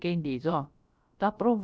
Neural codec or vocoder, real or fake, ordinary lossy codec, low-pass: codec, 16 kHz, about 1 kbps, DyCAST, with the encoder's durations; fake; none; none